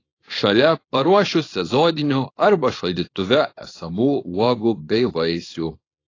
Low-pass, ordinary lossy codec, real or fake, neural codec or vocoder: 7.2 kHz; AAC, 32 kbps; fake; codec, 24 kHz, 0.9 kbps, WavTokenizer, small release